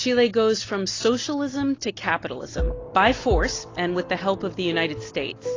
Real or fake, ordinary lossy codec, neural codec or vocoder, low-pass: real; AAC, 32 kbps; none; 7.2 kHz